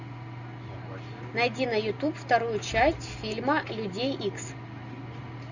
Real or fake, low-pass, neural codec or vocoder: real; 7.2 kHz; none